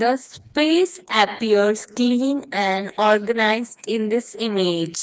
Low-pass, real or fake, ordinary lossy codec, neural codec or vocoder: none; fake; none; codec, 16 kHz, 2 kbps, FreqCodec, smaller model